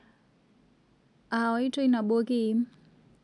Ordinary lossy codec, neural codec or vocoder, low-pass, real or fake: none; none; 10.8 kHz; real